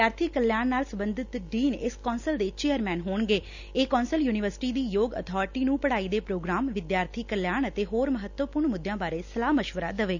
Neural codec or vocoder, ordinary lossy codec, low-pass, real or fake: none; none; 7.2 kHz; real